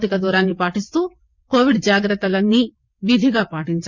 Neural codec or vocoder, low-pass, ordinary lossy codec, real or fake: vocoder, 22.05 kHz, 80 mel bands, WaveNeXt; 7.2 kHz; Opus, 64 kbps; fake